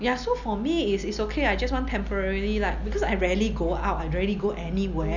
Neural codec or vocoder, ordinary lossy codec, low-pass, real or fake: none; none; 7.2 kHz; real